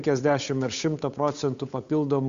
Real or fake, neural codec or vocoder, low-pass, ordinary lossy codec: fake; codec, 16 kHz, 8 kbps, FunCodec, trained on Chinese and English, 25 frames a second; 7.2 kHz; Opus, 64 kbps